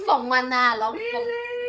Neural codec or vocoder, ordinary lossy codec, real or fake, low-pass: codec, 16 kHz, 8 kbps, FreqCodec, larger model; none; fake; none